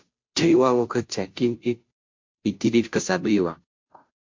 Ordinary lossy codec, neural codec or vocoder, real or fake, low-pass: MP3, 48 kbps; codec, 16 kHz, 0.5 kbps, FunCodec, trained on Chinese and English, 25 frames a second; fake; 7.2 kHz